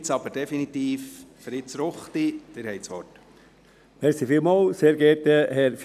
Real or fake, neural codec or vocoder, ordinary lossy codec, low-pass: real; none; none; 14.4 kHz